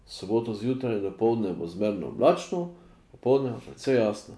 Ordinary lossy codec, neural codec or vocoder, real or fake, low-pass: none; none; real; none